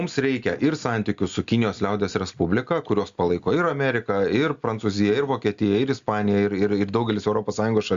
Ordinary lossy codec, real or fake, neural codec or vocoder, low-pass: Opus, 64 kbps; real; none; 7.2 kHz